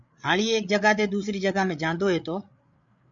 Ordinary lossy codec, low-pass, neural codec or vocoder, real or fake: MP3, 64 kbps; 7.2 kHz; codec, 16 kHz, 8 kbps, FreqCodec, larger model; fake